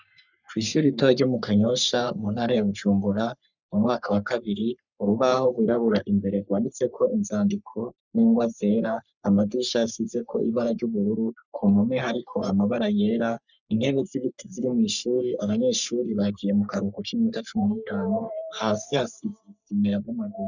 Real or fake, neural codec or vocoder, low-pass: fake; codec, 44.1 kHz, 3.4 kbps, Pupu-Codec; 7.2 kHz